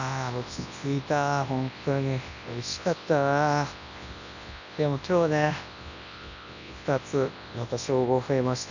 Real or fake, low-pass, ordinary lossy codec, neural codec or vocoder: fake; 7.2 kHz; none; codec, 24 kHz, 0.9 kbps, WavTokenizer, large speech release